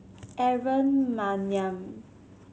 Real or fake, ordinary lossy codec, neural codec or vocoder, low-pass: real; none; none; none